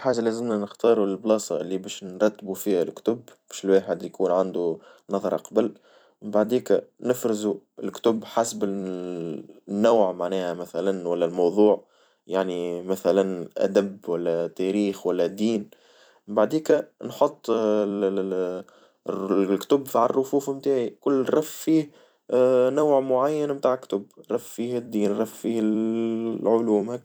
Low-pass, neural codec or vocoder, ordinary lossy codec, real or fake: none; vocoder, 44.1 kHz, 128 mel bands every 256 samples, BigVGAN v2; none; fake